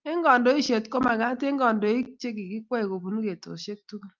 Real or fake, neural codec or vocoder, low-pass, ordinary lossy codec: real; none; 7.2 kHz; Opus, 32 kbps